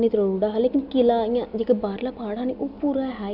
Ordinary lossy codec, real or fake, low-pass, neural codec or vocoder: none; real; 5.4 kHz; none